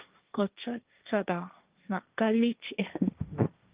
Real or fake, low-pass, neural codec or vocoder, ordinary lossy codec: fake; 3.6 kHz; codec, 16 kHz, 1.1 kbps, Voila-Tokenizer; Opus, 32 kbps